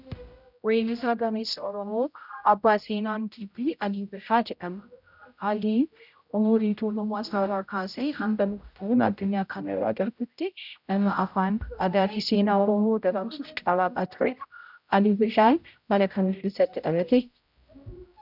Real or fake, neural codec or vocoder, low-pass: fake; codec, 16 kHz, 0.5 kbps, X-Codec, HuBERT features, trained on general audio; 5.4 kHz